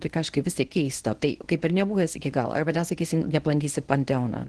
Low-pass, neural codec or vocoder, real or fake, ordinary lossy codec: 10.8 kHz; codec, 24 kHz, 0.9 kbps, WavTokenizer, medium speech release version 1; fake; Opus, 16 kbps